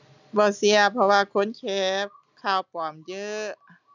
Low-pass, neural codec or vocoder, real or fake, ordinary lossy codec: 7.2 kHz; none; real; none